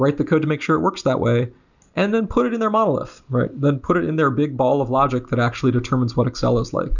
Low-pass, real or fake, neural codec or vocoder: 7.2 kHz; real; none